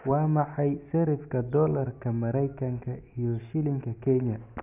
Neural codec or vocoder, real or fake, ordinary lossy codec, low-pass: none; real; none; 3.6 kHz